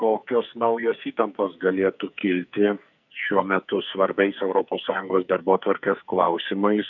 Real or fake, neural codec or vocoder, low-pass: fake; codec, 44.1 kHz, 2.6 kbps, SNAC; 7.2 kHz